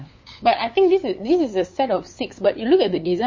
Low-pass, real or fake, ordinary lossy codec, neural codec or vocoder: 7.2 kHz; fake; MP3, 32 kbps; codec, 16 kHz, 8 kbps, FunCodec, trained on LibriTTS, 25 frames a second